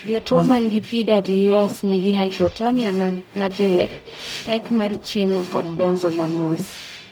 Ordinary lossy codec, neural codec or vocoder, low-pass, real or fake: none; codec, 44.1 kHz, 0.9 kbps, DAC; none; fake